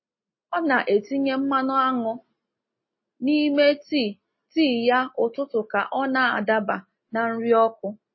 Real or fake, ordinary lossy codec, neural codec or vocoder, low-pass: real; MP3, 24 kbps; none; 7.2 kHz